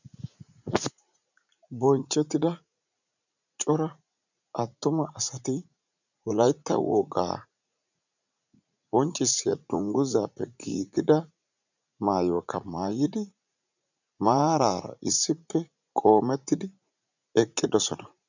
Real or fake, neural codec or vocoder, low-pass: fake; vocoder, 44.1 kHz, 80 mel bands, Vocos; 7.2 kHz